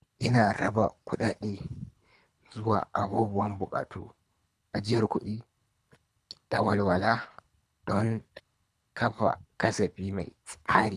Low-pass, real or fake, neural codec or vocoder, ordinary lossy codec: none; fake; codec, 24 kHz, 3 kbps, HILCodec; none